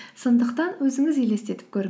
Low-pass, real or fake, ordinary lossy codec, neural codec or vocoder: none; real; none; none